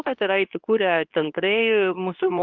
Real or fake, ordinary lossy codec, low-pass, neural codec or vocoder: fake; Opus, 24 kbps; 7.2 kHz; codec, 24 kHz, 0.9 kbps, WavTokenizer, medium speech release version 2